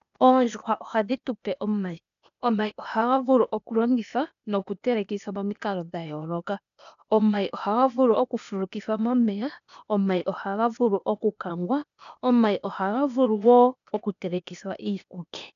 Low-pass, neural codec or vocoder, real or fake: 7.2 kHz; codec, 16 kHz, 0.8 kbps, ZipCodec; fake